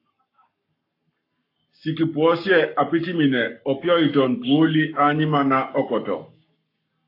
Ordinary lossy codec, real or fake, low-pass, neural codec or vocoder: AAC, 32 kbps; fake; 5.4 kHz; codec, 44.1 kHz, 7.8 kbps, Pupu-Codec